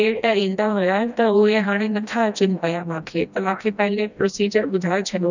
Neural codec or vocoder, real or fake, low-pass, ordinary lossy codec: codec, 16 kHz, 1 kbps, FreqCodec, smaller model; fake; 7.2 kHz; none